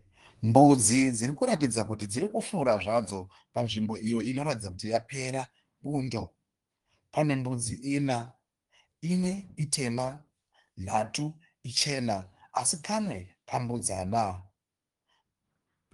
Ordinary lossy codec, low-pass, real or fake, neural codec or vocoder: Opus, 32 kbps; 10.8 kHz; fake; codec, 24 kHz, 1 kbps, SNAC